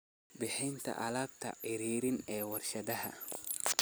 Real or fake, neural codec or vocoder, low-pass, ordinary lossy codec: real; none; none; none